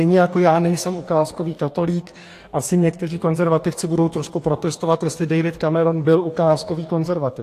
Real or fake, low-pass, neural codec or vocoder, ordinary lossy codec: fake; 14.4 kHz; codec, 44.1 kHz, 2.6 kbps, DAC; AAC, 64 kbps